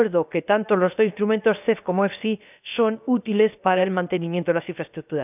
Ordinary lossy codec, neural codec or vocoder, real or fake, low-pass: none; codec, 16 kHz, about 1 kbps, DyCAST, with the encoder's durations; fake; 3.6 kHz